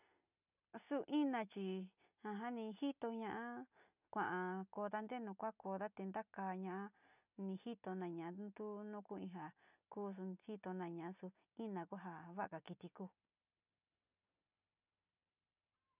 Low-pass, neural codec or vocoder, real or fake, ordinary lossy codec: 3.6 kHz; none; real; none